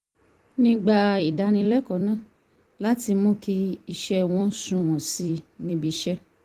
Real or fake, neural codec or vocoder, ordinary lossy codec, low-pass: fake; vocoder, 44.1 kHz, 128 mel bands every 256 samples, BigVGAN v2; Opus, 24 kbps; 14.4 kHz